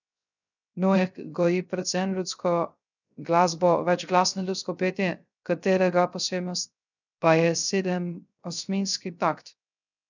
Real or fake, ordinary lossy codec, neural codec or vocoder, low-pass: fake; none; codec, 16 kHz, 0.3 kbps, FocalCodec; 7.2 kHz